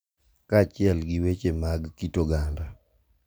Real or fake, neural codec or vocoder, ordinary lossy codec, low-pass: real; none; none; none